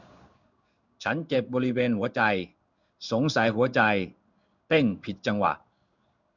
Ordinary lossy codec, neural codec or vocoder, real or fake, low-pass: none; codec, 16 kHz in and 24 kHz out, 1 kbps, XY-Tokenizer; fake; 7.2 kHz